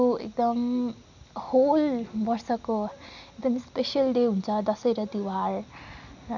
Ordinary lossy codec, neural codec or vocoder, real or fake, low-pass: none; none; real; 7.2 kHz